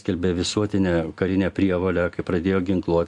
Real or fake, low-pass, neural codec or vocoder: real; 10.8 kHz; none